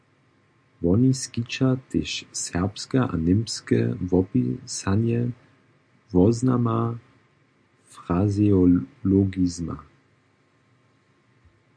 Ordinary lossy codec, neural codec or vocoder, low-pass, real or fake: MP3, 64 kbps; none; 9.9 kHz; real